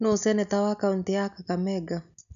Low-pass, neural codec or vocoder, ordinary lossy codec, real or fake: 7.2 kHz; none; none; real